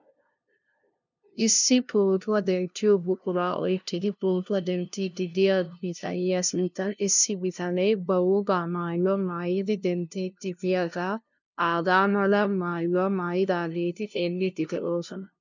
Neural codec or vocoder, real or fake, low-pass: codec, 16 kHz, 0.5 kbps, FunCodec, trained on LibriTTS, 25 frames a second; fake; 7.2 kHz